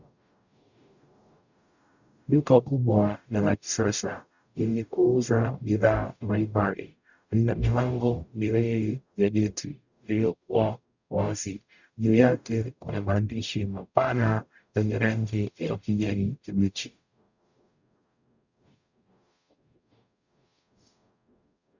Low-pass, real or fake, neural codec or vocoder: 7.2 kHz; fake; codec, 44.1 kHz, 0.9 kbps, DAC